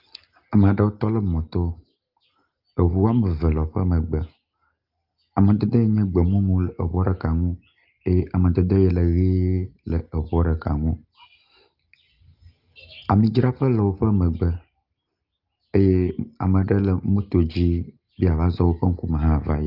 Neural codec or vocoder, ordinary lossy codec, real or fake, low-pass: none; Opus, 32 kbps; real; 5.4 kHz